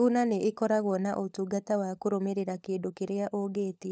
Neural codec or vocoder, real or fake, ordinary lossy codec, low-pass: codec, 16 kHz, 16 kbps, FunCodec, trained on LibriTTS, 50 frames a second; fake; none; none